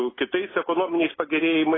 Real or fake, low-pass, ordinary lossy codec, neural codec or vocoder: real; 7.2 kHz; AAC, 16 kbps; none